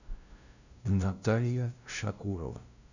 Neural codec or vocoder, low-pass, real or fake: codec, 16 kHz, 0.5 kbps, FunCodec, trained on LibriTTS, 25 frames a second; 7.2 kHz; fake